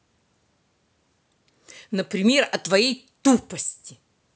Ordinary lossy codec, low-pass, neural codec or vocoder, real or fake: none; none; none; real